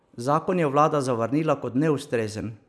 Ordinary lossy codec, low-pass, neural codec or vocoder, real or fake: none; none; none; real